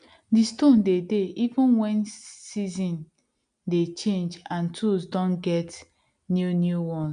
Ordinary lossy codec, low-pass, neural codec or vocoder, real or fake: none; 9.9 kHz; none; real